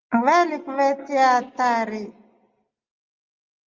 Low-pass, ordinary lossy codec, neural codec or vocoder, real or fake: 7.2 kHz; Opus, 32 kbps; none; real